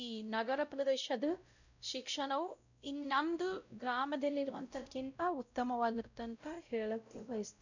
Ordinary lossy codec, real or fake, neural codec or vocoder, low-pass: none; fake; codec, 16 kHz, 0.5 kbps, X-Codec, WavLM features, trained on Multilingual LibriSpeech; 7.2 kHz